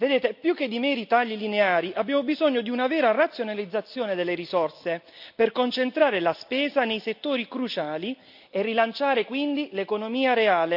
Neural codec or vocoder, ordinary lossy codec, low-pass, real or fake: none; AAC, 48 kbps; 5.4 kHz; real